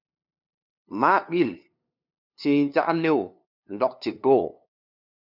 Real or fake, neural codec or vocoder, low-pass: fake; codec, 16 kHz, 2 kbps, FunCodec, trained on LibriTTS, 25 frames a second; 5.4 kHz